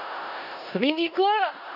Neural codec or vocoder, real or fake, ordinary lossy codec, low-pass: codec, 16 kHz in and 24 kHz out, 0.4 kbps, LongCat-Audio-Codec, four codebook decoder; fake; none; 5.4 kHz